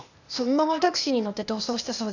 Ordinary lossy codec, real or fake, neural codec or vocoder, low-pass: none; fake; codec, 16 kHz, 0.8 kbps, ZipCodec; 7.2 kHz